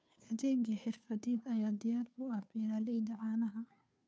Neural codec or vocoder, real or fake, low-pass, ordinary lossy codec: codec, 16 kHz, 2 kbps, FunCodec, trained on Chinese and English, 25 frames a second; fake; none; none